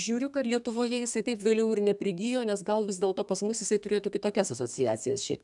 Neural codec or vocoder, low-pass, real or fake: codec, 32 kHz, 1.9 kbps, SNAC; 10.8 kHz; fake